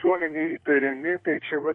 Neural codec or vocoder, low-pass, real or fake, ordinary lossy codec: codec, 24 kHz, 1 kbps, SNAC; 10.8 kHz; fake; MP3, 48 kbps